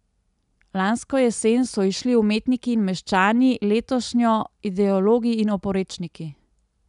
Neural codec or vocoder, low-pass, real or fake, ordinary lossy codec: none; 10.8 kHz; real; none